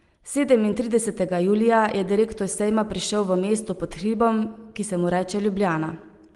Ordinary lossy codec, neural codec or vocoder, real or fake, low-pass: Opus, 24 kbps; none; real; 10.8 kHz